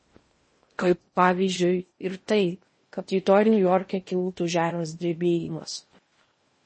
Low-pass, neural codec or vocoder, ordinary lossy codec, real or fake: 9.9 kHz; codec, 16 kHz in and 24 kHz out, 0.6 kbps, FocalCodec, streaming, 4096 codes; MP3, 32 kbps; fake